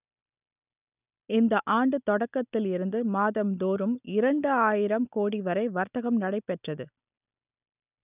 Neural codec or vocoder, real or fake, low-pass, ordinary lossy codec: none; real; 3.6 kHz; none